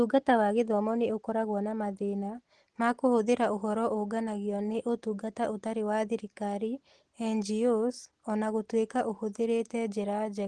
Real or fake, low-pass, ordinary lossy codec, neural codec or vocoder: real; 10.8 kHz; Opus, 16 kbps; none